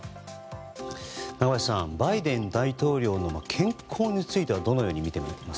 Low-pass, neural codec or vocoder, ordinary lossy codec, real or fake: none; none; none; real